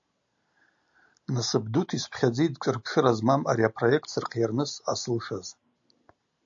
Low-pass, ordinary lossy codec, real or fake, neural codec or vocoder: 7.2 kHz; AAC, 64 kbps; real; none